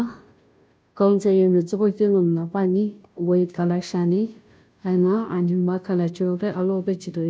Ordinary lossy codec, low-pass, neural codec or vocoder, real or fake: none; none; codec, 16 kHz, 0.5 kbps, FunCodec, trained on Chinese and English, 25 frames a second; fake